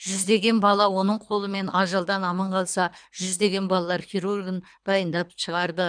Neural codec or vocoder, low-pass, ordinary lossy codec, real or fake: codec, 24 kHz, 3 kbps, HILCodec; 9.9 kHz; none; fake